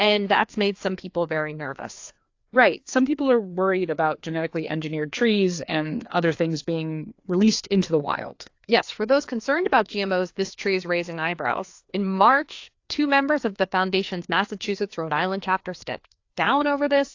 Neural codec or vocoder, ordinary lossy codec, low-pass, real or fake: codec, 16 kHz, 2 kbps, FreqCodec, larger model; AAC, 48 kbps; 7.2 kHz; fake